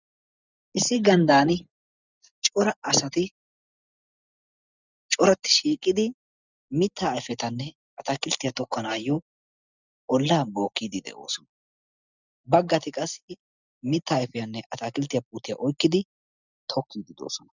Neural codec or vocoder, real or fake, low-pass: none; real; 7.2 kHz